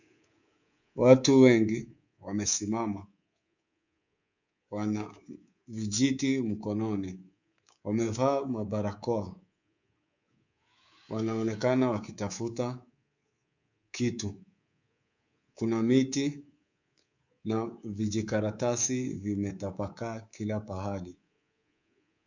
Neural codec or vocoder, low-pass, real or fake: codec, 24 kHz, 3.1 kbps, DualCodec; 7.2 kHz; fake